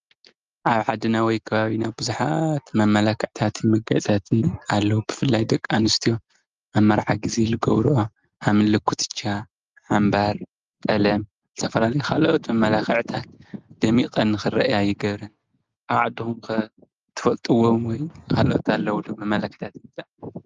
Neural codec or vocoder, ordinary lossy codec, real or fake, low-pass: none; Opus, 32 kbps; real; 7.2 kHz